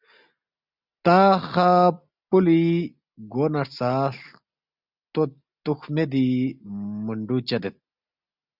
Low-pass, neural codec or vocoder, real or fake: 5.4 kHz; none; real